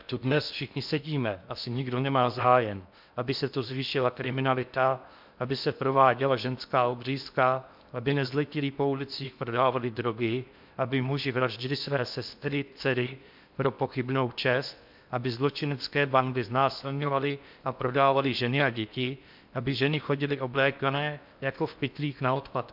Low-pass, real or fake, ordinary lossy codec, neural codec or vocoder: 5.4 kHz; fake; MP3, 48 kbps; codec, 16 kHz in and 24 kHz out, 0.8 kbps, FocalCodec, streaming, 65536 codes